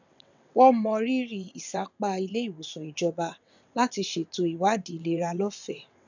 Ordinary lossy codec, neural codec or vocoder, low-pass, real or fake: none; vocoder, 22.05 kHz, 80 mel bands, WaveNeXt; 7.2 kHz; fake